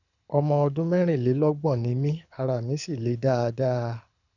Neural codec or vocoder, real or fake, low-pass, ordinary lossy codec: codec, 24 kHz, 6 kbps, HILCodec; fake; 7.2 kHz; none